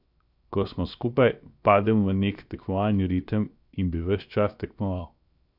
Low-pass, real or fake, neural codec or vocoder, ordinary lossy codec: 5.4 kHz; fake; codec, 16 kHz, 0.7 kbps, FocalCodec; none